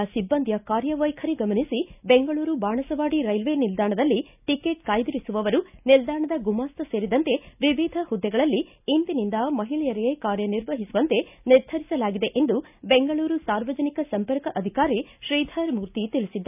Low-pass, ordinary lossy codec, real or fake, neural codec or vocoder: 3.6 kHz; none; real; none